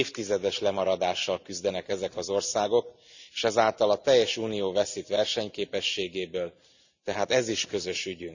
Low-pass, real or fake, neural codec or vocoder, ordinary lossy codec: 7.2 kHz; real; none; none